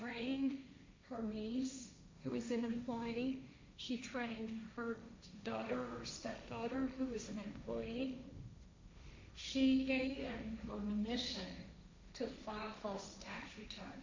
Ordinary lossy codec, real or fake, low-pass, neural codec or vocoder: MP3, 64 kbps; fake; 7.2 kHz; codec, 16 kHz, 1.1 kbps, Voila-Tokenizer